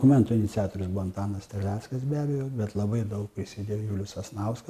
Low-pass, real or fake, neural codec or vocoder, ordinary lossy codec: 14.4 kHz; real; none; MP3, 96 kbps